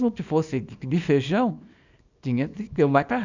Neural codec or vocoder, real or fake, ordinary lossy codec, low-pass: codec, 24 kHz, 0.9 kbps, WavTokenizer, small release; fake; none; 7.2 kHz